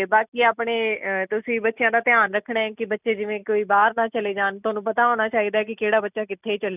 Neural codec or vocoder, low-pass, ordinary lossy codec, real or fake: none; 3.6 kHz; none; real